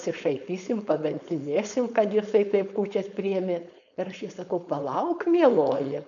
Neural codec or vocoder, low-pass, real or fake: codec, 16 kHz, 4.8 kbps, FACodec; 7.2 kHz; fake